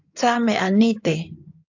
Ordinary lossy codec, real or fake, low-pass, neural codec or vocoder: AAC, 48 kbps; fake; 7.2 kHz; codec, 16 kHz in and 24 kHz out, 2.2 kbps, FireRedTTS-2 codec